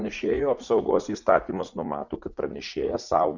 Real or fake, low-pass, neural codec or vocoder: fake; 7.2 kHz; vocoder, 44.1 kHz, 80 mel bands, Vocos